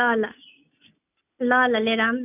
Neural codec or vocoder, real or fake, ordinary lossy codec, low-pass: none; real; none; 3.6 kHz